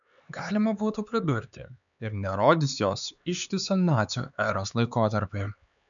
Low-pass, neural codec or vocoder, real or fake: 7.2 kHz; codec, 16 kHz, 4 kbps, X-Codec, HuBERT features, trained on LibriSpeech; fake